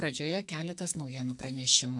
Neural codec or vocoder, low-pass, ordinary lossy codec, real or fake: codec, 44.1 kHz, 2.6 kbps, SNAC; 10.8 kHz; AAC, 64 kbps; fake